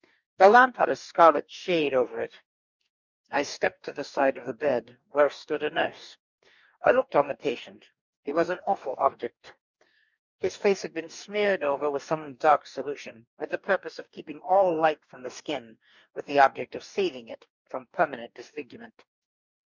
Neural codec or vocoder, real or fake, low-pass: codec, 44.1 kHz, 2.6 kbps, DAC; fake; 7.2 kHz